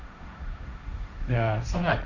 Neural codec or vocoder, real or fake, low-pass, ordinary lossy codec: codec, 16 kHz, 1.1 kbps, Voila-Tokenizer; fake; 7.2 kHz; none